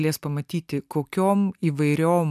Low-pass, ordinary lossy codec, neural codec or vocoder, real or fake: 14.4 kHz; MP3, 96 kbps; none; real